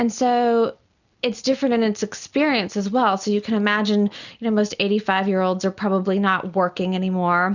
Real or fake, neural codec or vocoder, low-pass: real; none; 7.2 kHz